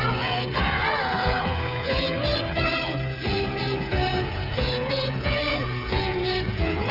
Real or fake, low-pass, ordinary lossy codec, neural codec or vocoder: fake; 5.4 kHz; none; codec, 16 kHz, 8 kbps, FreqCodec, smaller model